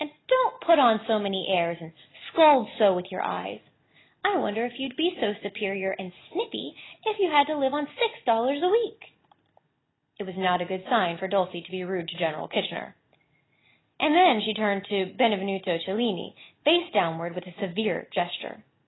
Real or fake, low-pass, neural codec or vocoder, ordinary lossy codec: real; 7.2 kHz; none; AAC, 16 kbps